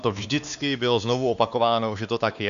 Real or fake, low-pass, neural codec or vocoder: fake; 7.2 kHz; codec, 16 kHz, 2 kbps, X-Codec, WavLM features, trained on Multilingual LibriSpeech